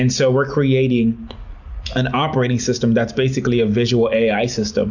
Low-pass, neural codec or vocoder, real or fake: 7.2 kHz; none; real